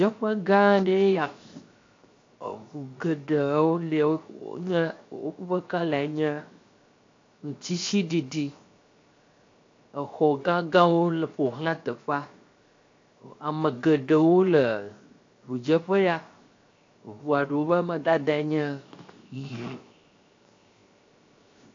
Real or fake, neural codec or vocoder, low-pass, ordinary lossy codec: fake; codec, 16 kHz, 0.7 kbps, FocalCodec; 7.2 kHz; AAC, 48 kbps